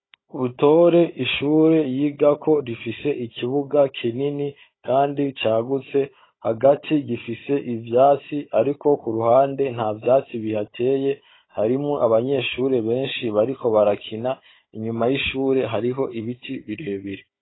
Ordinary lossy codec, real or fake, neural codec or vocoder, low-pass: AAC, 16 kbps; fake; codec, 16 kHz, 16 kbps, FunCodec, trained on Chinese and English, 50 frames a second; 7.2 kHz